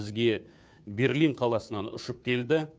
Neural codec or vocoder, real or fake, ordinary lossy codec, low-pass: codec, 16 kHz, 2 kbps, FunCodec, trained on Chinese and English, 25 frames a second; fake; none; none